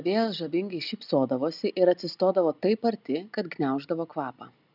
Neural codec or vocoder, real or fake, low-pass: none; real; 5.4 kHz